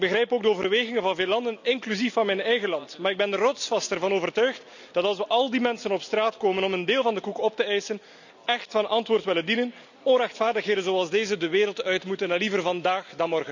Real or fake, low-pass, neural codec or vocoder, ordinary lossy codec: real; 7.2 kHz; none; none